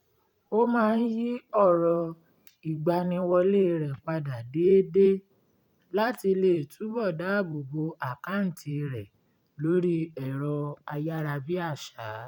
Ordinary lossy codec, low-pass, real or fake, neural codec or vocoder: none; 19.8 kHz; fake; vocoder, 44.1 kHz, 128 mel bands every 512 samples, BigVGAN v2